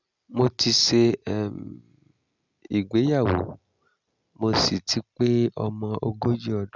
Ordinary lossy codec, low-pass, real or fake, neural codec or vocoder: none; 7.2 kHz; real; none